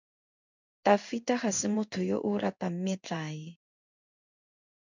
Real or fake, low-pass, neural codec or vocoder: fake; 7.2 kHz; codec, 16 kHz in and 24 kHz out, 1 kbps, XY-Tokenizer